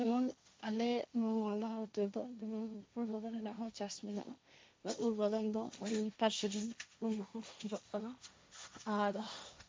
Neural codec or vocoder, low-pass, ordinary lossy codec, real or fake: codec, 16 kHz, 1.1 kbps, Voila-Tokenizer; none; none; fake